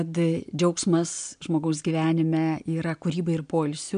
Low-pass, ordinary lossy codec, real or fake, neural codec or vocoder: 9.9 kHz; MP3, 64 kbps; real; none